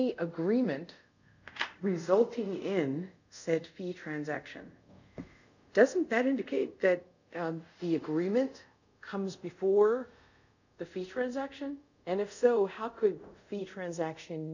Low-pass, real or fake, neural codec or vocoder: 7.2 kHz; fake; codec, 24 kHz, 0.5 kbps, DualCodec